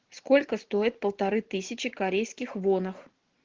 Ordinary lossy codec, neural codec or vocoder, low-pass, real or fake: Opus, 16 kbps; none; 7.2 kHz; real